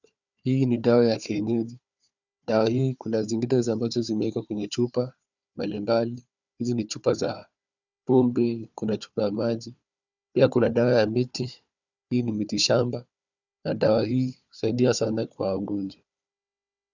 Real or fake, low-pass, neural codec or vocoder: fake; 7.2 kHz; codec, 16 kHz, 4 kbps, FunCodec, trained on Chinese and English, 50 frames a second